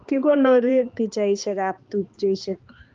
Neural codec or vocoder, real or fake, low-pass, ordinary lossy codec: codec, 16 kHz, 2 kbps, X-Codec, HuBERT features, trained on balanced general audio; fake; 7.2 kHz; Opus, 32 kbps